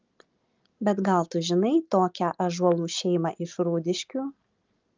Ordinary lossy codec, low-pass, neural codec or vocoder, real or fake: Opus, 32 kbps; 7.2 kHz; none; real